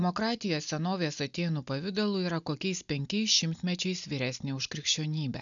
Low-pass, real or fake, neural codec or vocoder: 7.2 kHz; real; none